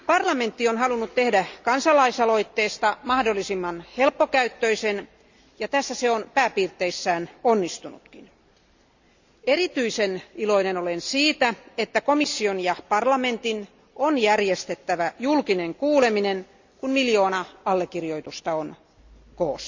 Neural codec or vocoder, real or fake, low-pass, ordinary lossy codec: none; real; 7.2 kHz; Opus, 64 kbps